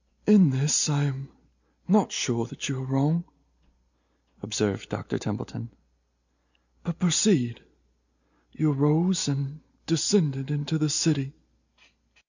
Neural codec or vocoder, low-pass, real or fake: none; 7.2 kHz; real